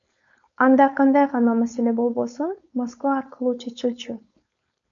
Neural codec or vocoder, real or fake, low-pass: codec, 16 kHz, 4.8 kbps, FACodec; fake; 7.2 kHz